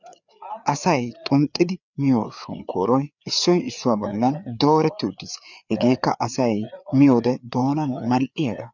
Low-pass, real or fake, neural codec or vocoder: 7.2 kHz; fake; codec, 16 kHz, 4 kbps, FreqCodec, larger model